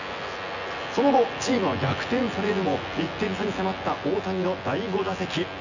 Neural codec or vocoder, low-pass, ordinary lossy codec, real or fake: vocoder, 24 kHz, 100 mel bands, Vocos; 7.2 kHz; none; fake